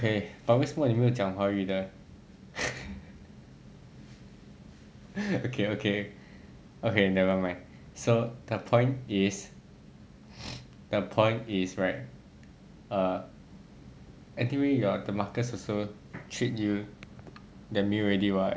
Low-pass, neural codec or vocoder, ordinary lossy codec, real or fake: none; none; none; real